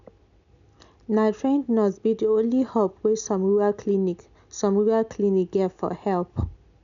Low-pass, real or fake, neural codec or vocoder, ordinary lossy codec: 7.2 kHz; real; none; none